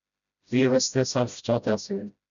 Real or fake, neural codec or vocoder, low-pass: fake; codec, 16 kHz, 0.5 kbps, FreqCodec, smaller model; 7.2 kHz